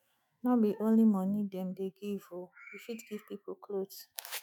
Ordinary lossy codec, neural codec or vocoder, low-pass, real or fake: none; autoencoder, 48 kHz, 128 numbers a frame, DAC-VAE, trained on Japanese speech; 19.8 kHz; fake